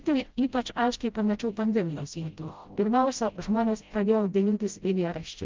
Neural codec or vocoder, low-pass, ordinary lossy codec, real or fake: codec, 16 kHz, 0.5 kbps, FreqCodec, smaller model; 7.2 kHz; Opus, 24 kbps; fake